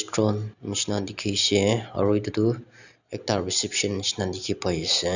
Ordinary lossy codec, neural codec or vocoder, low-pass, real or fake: none; none; 7.2 kHz; real